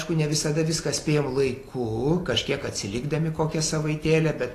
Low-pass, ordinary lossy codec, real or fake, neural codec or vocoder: 14.4 kHz; AAC, 48 kbps; fake; vocoder, 44.1 kHz, 128 mel bands every 256 samples, BigVGAN v2